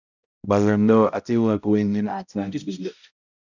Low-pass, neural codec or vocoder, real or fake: 7.2 kHz; codec, 16 kHz, 0.5 kbps, X-Codec, HuBERT features, trained on balanced general audio; fake